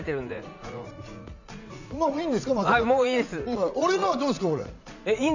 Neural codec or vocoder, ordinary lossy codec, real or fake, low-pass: vocoder, 44.1 kHz, 80 mel bands, Vocos; none; fake; 7.2 kHz